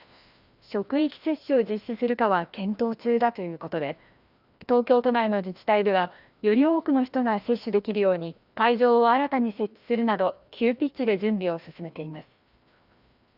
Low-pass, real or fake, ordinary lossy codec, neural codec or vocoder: 5.4 kHz; fake; Opus, 64 kbps; codec, 16 kHz, 1 kbps, FreqCodec, larger model